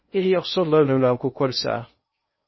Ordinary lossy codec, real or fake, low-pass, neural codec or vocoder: MP3, 24 kbps; fake; 7.2 kHz; codec, 16 kHz in and 24 kHz out, 0.6 kbps, FocalCodec, streaming, 2048 codes